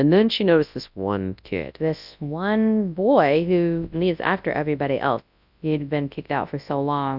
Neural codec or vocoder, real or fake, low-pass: codec, 24 kHz, 0.9 kbps, WavTokenizer, large speech release; fake; 5.4 kHz